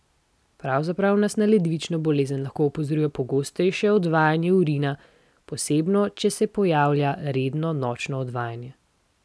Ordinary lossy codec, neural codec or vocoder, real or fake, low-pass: none; none; real; none